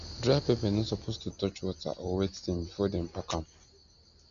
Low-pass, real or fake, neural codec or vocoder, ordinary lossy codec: 7.2 kHz; real; none; none